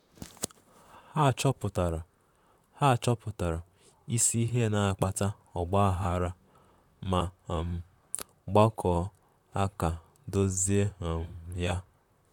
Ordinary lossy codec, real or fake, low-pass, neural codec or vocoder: none; fake; 19.8 kHz; vocoder, 44.1 kHz, 128 mel bands, Pupu-Vocoder